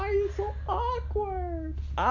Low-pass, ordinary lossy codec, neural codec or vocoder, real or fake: 7.2 kHz; none; none; real